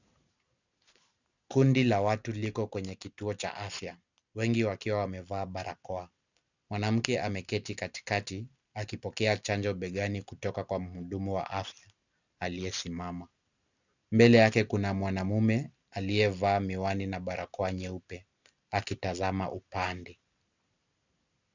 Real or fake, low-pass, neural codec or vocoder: real; 7.2 kHz; none